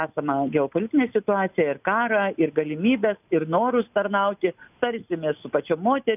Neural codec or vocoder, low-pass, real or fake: none; 3.6 kHz; real